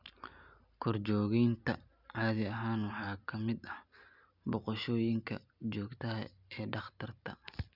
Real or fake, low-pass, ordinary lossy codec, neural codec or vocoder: real; 5.4 kHz; none; none